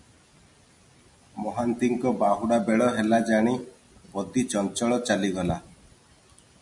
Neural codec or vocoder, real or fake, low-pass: none; real; 10.8 kHz